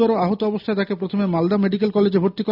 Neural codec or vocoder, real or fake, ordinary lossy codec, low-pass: none; real; none; 5.4 kHz